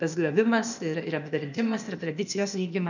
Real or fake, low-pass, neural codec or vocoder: fake; 7.2 kHz; codec, 16 kHz, 0.8 kbps, ZipCodec